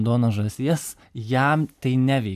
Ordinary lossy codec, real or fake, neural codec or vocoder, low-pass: AAC, 96 kbps; real; none; 14.4 kHz